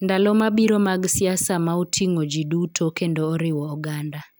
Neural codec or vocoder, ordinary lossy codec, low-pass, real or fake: none; none; none; real